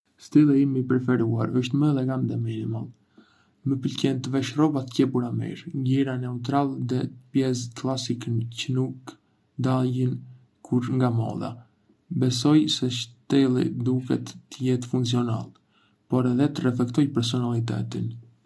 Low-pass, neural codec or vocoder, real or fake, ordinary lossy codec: none; none; real; none